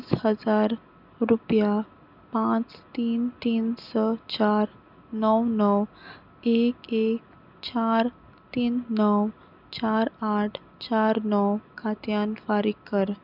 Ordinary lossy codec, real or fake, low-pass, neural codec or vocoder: none; real; 5.4 kHz; none